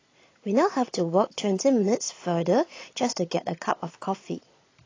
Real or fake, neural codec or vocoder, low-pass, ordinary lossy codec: fake; vocoder, 22.05 kHz, 80 mel bands, Vocos; 7.2 kHz; AAC, 32 kbps